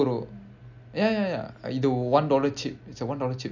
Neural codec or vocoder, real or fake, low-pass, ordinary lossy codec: none; real; 7.2 kHz; none